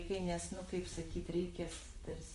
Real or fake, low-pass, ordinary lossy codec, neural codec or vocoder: fake; 14.4 kHz; MP3, 48 kbps; vocoder, 44.1 kHz, 128 mel bands, Pupu-Vocoder